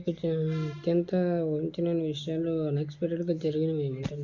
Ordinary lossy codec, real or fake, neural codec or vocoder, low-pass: Opus, 64 kbps; real; none; 7.2 kHz